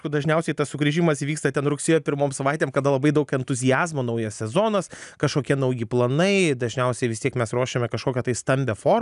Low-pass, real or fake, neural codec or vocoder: 10.8 kHz; real; none